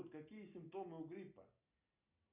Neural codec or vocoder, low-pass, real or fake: none; 3.6 kHz; real